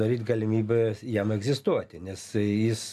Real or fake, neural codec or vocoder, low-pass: real; none; 14.4 kHz